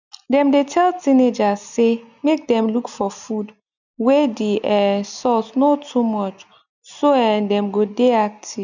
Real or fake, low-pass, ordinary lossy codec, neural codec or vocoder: real; 7.2 kHz; none; none